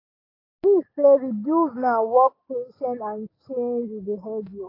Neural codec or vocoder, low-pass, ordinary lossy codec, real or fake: none; 5.4 kHz; AAC, 24 kbps; real